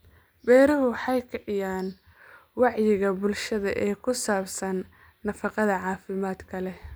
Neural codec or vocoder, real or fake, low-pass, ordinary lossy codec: none; real; none; none